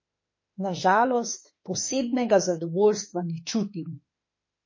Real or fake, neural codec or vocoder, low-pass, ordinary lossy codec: fake; autoencoder, 48 kHz, 32 numbers a frame, DAC-VAE, trained on Japanese speech; 7.2 kHz; MP3, 32 kbps